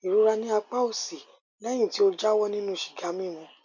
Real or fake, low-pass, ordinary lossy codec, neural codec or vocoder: real; 7.2 kHz; none; none